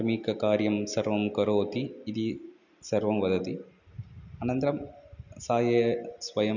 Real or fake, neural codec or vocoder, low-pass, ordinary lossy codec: real; none; 7.2 kHz; none